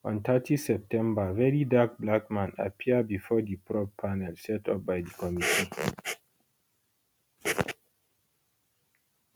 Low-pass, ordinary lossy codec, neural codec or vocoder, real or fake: none; none; none; real